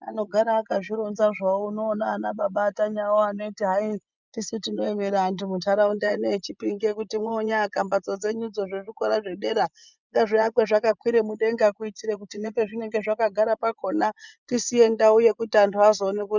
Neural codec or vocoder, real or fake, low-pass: vocoder, 24 kHz, 100 mel bands, Vocos; fake; 7.2 kHz